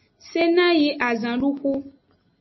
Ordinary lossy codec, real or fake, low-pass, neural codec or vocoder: MP3, 24 kbps; real; 7.2 kHz; none